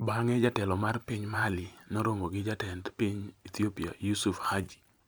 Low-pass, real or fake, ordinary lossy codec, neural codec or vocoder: none; fake; none; vocoder, 44.1 kHz, 128 mel bands every 512 samples, BigVGAN v2